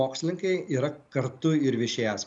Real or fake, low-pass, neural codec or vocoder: real; 10.8 kHz; none